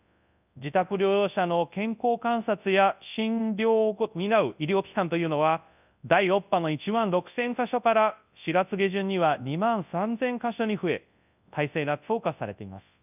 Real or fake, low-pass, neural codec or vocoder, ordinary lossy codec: fake; 3.6 kHz; codec, 24 kHz, 0.9 kbps, WavTokenizer, large speech release; none